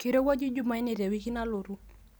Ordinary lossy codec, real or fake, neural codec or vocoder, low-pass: none; real; none; none